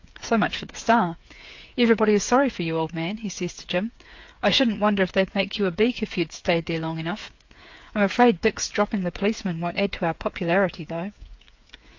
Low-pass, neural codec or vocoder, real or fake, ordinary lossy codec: 7.2 kHz; codec, 16 kHz, 8 kbps, FreqCodec, smaller model; fake; AAC, 48 kbps